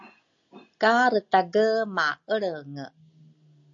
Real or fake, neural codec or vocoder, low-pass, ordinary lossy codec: real; none; 7.2 kHz; AAC, 64 kbps